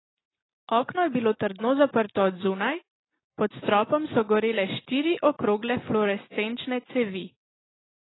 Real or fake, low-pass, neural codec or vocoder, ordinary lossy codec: real; 7.2 kHz; none; AAC, 16 kbps